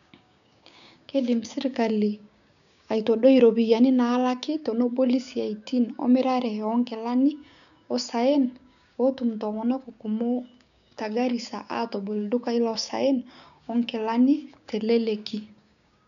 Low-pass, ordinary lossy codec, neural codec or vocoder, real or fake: 7.2 kHz; none; codec, 16 kHz, 6 kbps, DAC; fake